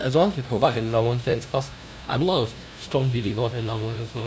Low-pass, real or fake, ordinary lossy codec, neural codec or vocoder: none; fake; none; codec, 16 kHz, 0.5 kbps, FunCodec, trained on LibriTTS, 25 frames a second